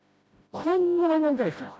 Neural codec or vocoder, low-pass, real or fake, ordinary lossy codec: codec, 16 kHz, 0.5 kbps, FreqCodec, smaller model; none; fake; none